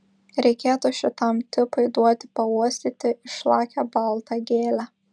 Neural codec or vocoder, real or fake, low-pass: none; real; 9.9 kHz